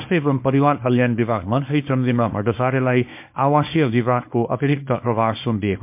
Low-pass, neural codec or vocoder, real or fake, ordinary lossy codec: 3.6 kHz; codec, 24 kHz, 0.9 kbps, WavTokenizer, small release; fake; MP3, 32 kbps